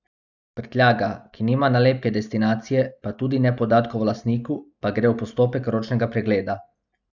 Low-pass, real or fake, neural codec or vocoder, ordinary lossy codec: 7.2 kHz; real; none; none